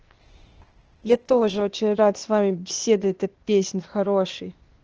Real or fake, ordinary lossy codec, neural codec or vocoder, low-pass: fake; Opus, 16 kbps; codec, 16 kHz, 0.8 kbps, ZipCodec; 7.2 kHz